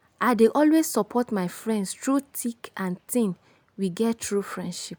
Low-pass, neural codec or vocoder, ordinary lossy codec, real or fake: none; none; none; real